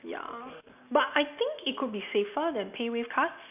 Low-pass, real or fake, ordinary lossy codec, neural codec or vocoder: 3.6 kHz; real; none; none